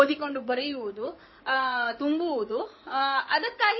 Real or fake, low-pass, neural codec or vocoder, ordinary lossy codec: fake; 7.2 kHz; codec, 16 kHz in and 24 kHz out, 2.2 kbps, FireRedTTS-2 codec; MP3, 24 kbps